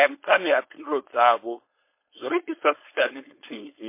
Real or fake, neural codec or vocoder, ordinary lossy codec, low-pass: fake; codec, 16 kHz, 4.8 kbps, FACodec; MP3, 24 kbps; 3.6 kHz